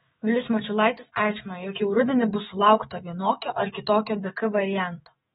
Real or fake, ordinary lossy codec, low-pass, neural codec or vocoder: fake; AAC, 16 kbps; 19.8 kHz; autoencoder, 48 kHz, 128 numbers a frame, DAC-VAE, trained on Japanese speech